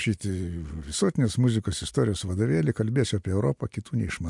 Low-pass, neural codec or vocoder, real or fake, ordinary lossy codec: 10.8 kHz; none; real; MP3, 64 kbps